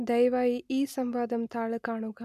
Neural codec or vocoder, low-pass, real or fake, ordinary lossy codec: none; 14.4 kHz; real; none